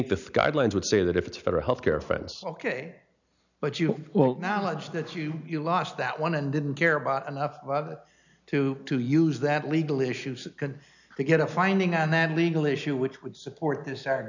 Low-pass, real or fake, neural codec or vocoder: 7.2 kHz; real; none